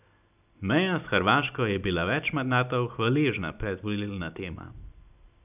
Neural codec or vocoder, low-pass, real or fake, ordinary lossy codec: none; 3.6 kHz; real; none